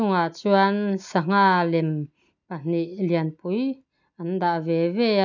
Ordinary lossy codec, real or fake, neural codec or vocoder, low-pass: none; real; none; 7.2 kHz